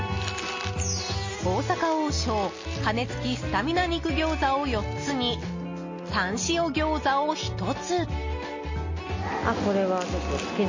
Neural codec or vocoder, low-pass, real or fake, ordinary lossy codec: none; 7.2 kHz; real; MP3, 32 kbps